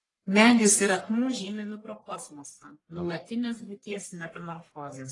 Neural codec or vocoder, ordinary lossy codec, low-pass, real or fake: codec, 44.1 kHz, 1.7 kbps, Pupu-Codec; AAC, 32 kbps; 10.8 kHz; fake